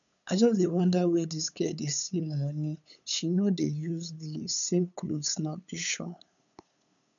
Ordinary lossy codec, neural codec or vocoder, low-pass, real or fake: none; codec, 16 kHz, 8 kbps, FunCodec, trained on LibriTTS, 25 frames a second; 7.2 kHz; fake